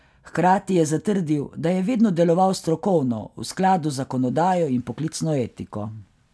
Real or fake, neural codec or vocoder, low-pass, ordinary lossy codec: real; none; none; none